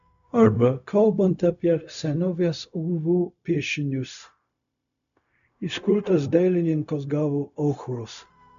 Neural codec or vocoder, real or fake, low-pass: codec, 16 kHz, 0.4 kbps, LongCat-Audio-Codec; fake; 7.2 kHz